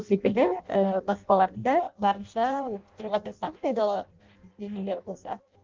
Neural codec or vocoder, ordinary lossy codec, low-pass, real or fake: codec, 16 kHz in and 24 kHz out, 0.6 kbps, FireRedTTS-2 codec; Opus, 32 kbps; 7.2 kHz; fake